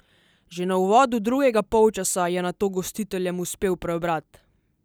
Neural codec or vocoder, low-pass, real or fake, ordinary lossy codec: none; none; real; none